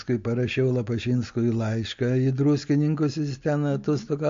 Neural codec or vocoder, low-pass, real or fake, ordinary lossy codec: none; 7.2 kHz; real; MP3, 48 kbps